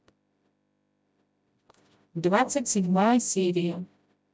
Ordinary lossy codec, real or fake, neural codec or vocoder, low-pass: none; fake; codec, 16 kHz, 0.5 kbps, FreqCodec, smaller model; none